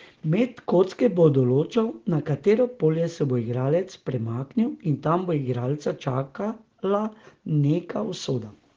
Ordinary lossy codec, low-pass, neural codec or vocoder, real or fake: Opus, 16 kbps; 7.2 kHz; none; real